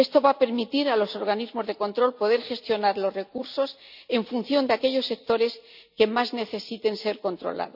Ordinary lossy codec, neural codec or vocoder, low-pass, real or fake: none; none; 5.4 kHz; real